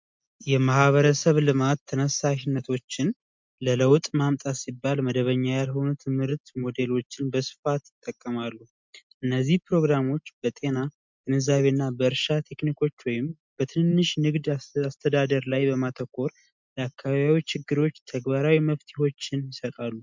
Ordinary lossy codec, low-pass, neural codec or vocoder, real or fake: MP3, 64 kbps; 7.2 kHz; none; real